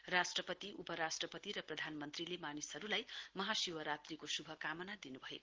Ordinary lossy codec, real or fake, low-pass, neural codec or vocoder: Opus, 16 kbps; real; 7.2 kHz; none